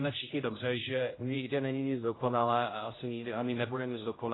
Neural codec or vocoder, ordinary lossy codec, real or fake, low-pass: codec, 16 kHz, 0.5 kbps, X-Codec, HuBERT features, trained on general audio; AAC, 16 kbps; fake; 7.2 kHz